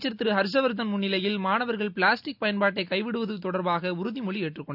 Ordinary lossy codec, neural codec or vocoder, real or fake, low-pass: none; none; real; 5.4 kHz